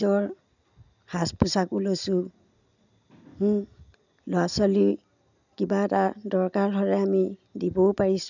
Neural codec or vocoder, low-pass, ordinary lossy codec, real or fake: none; 7.2 kHz; none; real